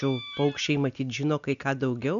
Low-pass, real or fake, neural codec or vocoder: 7.2 kHz; real; none